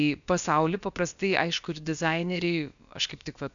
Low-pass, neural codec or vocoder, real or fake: 7.2 kHz; codec, 16 kHz, about 1 kbps, DyCAST, with the encoder's durations; fake